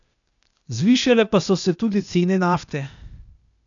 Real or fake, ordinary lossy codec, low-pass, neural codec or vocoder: fake; none; 7.2 kHz; codec, 16 kHz, 0.8 kbps, ZipCodec